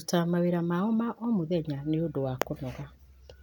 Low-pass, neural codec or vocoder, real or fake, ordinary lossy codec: 19.8 kHz; none; real; none